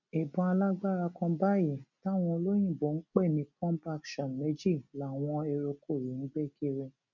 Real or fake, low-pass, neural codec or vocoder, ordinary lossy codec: real; 7.2 kHz; none; none